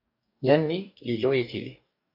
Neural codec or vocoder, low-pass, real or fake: codec, 44.1 kHz, 2.6 kbps, DAC; 5.4 kHz; fake